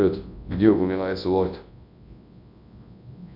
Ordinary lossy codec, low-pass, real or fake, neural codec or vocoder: MP3, 48 kbps; 5.4 kHz; fake; codec, 24 kHz, 0.9 kbps, WavTokenizer, large speech release